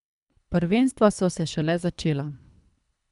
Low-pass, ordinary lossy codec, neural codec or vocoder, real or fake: 10.8 kHz; none; codec, 24 kHz, 3 kbps, HILCodec; fake